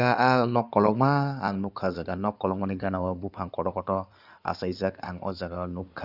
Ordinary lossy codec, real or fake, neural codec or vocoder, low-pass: none; fake; codec, 16 kHz in and 24 kHz out, 2.2 kbps, FireRedTTS-2 codec; 5.4 kHz